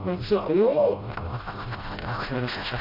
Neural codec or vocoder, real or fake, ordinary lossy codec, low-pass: codec, 16 kHz, 0.5 kbps, FreqCodec, smaller model; fake; AAC, 32 kbps; 5.4 kHz